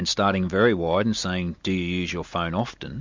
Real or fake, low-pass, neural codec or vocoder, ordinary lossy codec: real; 7.2 kHz; none; MP3, 64 kbps